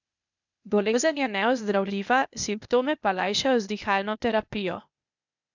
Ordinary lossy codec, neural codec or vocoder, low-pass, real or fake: none; codec, 16 kHz, 0.8 kbps, ZipCodec; 7.2 kHz; fake